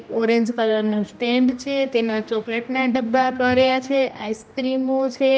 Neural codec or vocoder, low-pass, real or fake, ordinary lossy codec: codec, 16 kHz, 1 kbps, X-Codec, HuBERT features, trained on general audio; none; fake; none